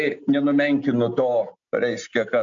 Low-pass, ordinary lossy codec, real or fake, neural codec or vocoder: 7.2 kHz; AAC, 64 kbps; real; none